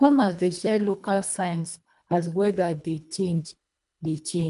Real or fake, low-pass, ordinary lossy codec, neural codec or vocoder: fake; 10.8 kHz; none; codec, 24 kHz, 1.5 kbps, HILCodec